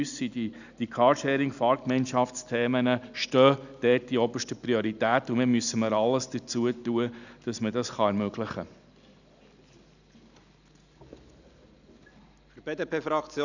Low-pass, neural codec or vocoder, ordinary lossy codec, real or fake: 7.2 kHz; none; none; real